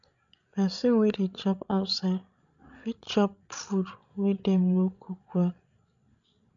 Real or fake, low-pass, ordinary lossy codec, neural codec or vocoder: fake; 7.2 kHz; none; codec, 16 kHz, 16 kbps, FreqCodec, larger model